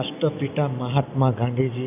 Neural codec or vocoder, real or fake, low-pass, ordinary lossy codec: none; real; 3.6 kHz; none